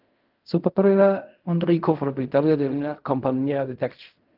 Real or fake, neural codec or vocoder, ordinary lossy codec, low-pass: fake; codec, 16 kHz in and 24 kHz out, 0.4 kbps, LongCat-Audio-Codec, fine tuned four codebook decoder; Opus, 24 kbps; 5.4 kHz